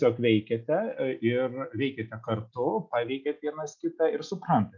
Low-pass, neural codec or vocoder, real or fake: 7.2 kHz; none; real